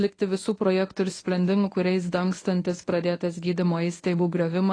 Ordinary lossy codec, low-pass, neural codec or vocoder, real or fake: AAC, 32 kbps; 9.9 kHz; codec, 24 kHz, 0.9 kbps, WavTokenizer, medium speech release version 1; fake